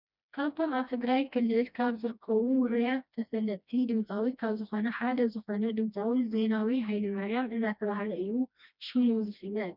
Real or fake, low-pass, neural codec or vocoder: fake; 5.4 kHz; codec, 16 kHz, 1 kbps, FreqCodec, smaller model